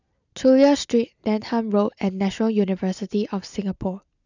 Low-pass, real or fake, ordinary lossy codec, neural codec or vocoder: 7.2 kHz; real; none; none